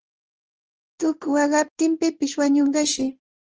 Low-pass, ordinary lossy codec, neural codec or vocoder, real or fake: 7.2 kHz; Opus, 16 kbps; codec, 16 kHz in and 24 kHz out, 1 kbps, XY-Tokenizer; fake